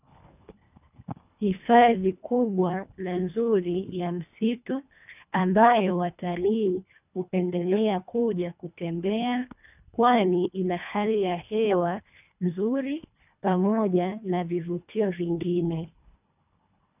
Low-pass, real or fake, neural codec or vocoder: 3.6 kHz; fake; codec, 24 kHz, 1.5 kbps, HILCodec